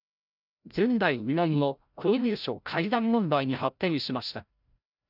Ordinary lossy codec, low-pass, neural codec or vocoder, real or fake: none; 5.4 kHz; codec, 16 kHz, 0.5 kbps, FreqCodec, larger model; fake